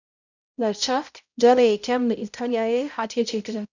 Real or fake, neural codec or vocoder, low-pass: fake; codec, 16 kHz, 0.5 kbps, X-Codec, HuBERT features, trained on balanced general audio; 7.2 kHz